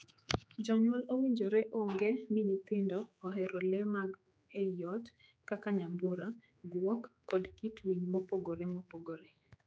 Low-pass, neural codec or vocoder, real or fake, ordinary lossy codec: none; codec, 16 kHz, 4 kbps, X-Codec, HuBERT features, trained on general audio; fake; none